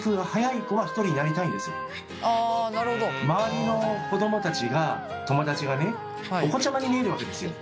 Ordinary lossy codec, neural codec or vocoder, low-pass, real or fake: none; none; none; real